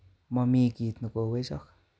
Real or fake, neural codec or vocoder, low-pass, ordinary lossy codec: real; none; none; none